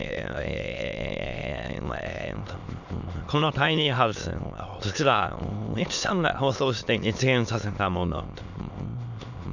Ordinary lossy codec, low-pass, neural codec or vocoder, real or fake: none; 7.2 kHz; autoencoder, 22.05 kHz, a latent of 192 numbers a frame, VITS, trained on many speakers; fake